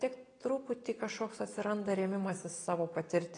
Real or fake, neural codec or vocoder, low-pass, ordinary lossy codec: real; none; 9.9 kHz; AAC, 32 kbps